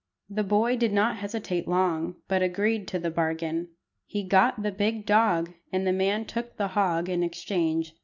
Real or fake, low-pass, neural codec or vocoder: real; 7.2 kHz; none